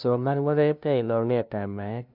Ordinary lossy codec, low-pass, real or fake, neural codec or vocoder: none; 5.4 kHz; fake; codec, 16 kHz, 0.5 kbps, FunCodec, trained on LibriTTS, 25 frames a second